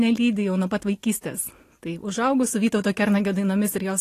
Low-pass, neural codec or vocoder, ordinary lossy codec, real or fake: 14.4 kHz; none; AAC, 48 kbps; real